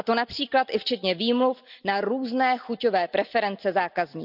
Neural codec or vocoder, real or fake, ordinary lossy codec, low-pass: none; real; none; 5.4 kHz